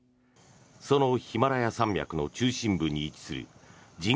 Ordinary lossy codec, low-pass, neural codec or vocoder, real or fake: none; none; none; real